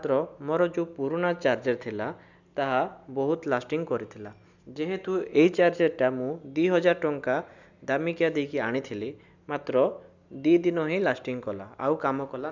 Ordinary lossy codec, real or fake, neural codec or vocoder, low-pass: none; real; none; 7.2 kHz